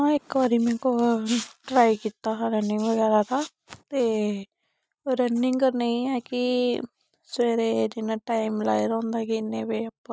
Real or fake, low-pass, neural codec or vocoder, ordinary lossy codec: real; none; none; none